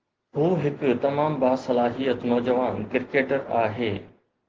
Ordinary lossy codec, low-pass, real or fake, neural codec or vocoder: Opus, 16 kbps; 7.2 kHz; real; none